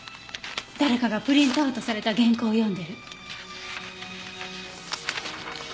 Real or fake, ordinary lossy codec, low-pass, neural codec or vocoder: real; none; none; none